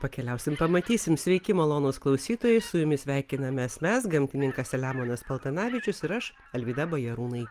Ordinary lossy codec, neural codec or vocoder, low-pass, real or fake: Opus, 32 kbps; none; 14.4 kHz; real